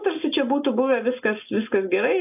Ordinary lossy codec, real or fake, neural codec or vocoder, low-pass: AAC, 32 kbps; real; none; 3.6 kHz